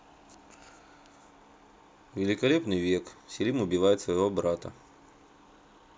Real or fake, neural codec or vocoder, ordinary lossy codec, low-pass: real; none; none; none